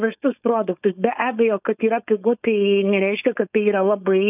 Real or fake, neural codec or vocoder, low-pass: fake; codec, 16 kHz, 4.8 kbps, FACodec; 3.6 kHz